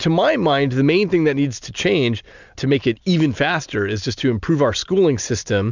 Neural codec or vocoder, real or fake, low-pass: none; real; 7.2 kHz